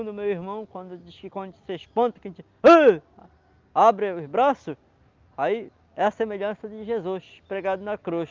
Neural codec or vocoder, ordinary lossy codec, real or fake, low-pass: none; Opus, 32 kbps; real; 7.2 kHz